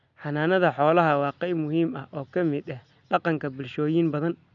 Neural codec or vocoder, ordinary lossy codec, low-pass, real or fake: none; none; 7.2 kHz; real